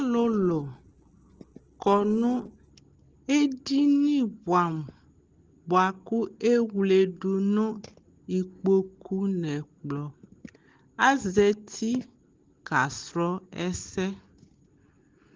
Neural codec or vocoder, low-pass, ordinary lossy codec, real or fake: none; 7.2 kHz; Opus, 16 kbps; real